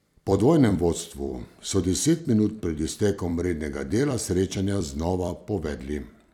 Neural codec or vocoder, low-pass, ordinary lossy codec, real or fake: vocoder, 44.1 kHz, 128 mel bands every 256 samples, BigVGAN v2; 19.8 kHz; none; fake